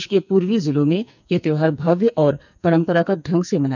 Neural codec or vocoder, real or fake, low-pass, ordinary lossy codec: codec, 44.1 kHz, 2.6 kbps, SNAC; fake; 7.2 kHz; none